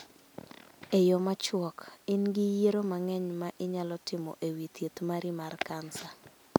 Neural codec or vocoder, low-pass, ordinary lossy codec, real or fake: none; none; none; real